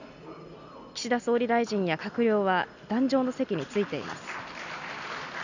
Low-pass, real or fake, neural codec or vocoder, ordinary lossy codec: 7.2 kHz; real; none; none